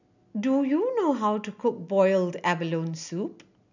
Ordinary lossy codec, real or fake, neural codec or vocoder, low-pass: none; real; none; 7.2 kHz